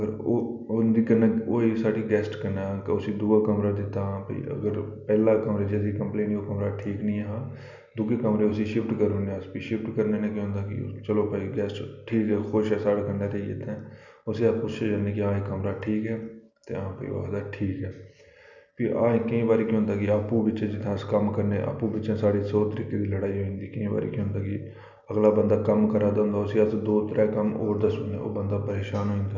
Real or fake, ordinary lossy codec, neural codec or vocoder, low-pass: real; none; none; 7.2 kHz